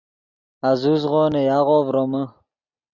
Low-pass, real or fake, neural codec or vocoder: 7.2 kHz; real; none